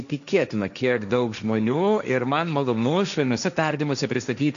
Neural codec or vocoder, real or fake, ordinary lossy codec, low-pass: codec, 16 kHz, 1.1 kbps, Voila-Tokenizer; fake; MP3, 96 kbps; 7.2 kHz